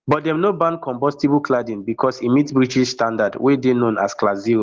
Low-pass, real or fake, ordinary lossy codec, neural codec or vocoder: 7.2 kHz; real; Opus, 16 kbps; none